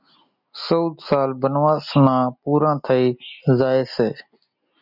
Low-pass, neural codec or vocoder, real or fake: 5.4 kHz; none; real